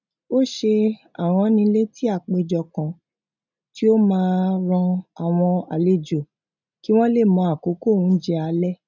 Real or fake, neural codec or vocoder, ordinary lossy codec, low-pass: real; none; none; 7.2 kHz